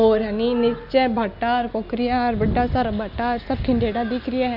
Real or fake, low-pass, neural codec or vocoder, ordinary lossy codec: real; 5.4 kHz; none; none